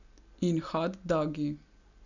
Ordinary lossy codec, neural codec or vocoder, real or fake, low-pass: none; none; real; 7.2 kHz